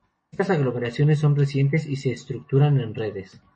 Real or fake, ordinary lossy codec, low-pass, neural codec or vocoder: real; MP3, 32 kbps; 10.8 kHz; none